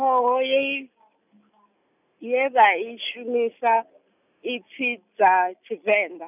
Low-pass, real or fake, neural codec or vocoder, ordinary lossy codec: 3.6 kHz; real; none; none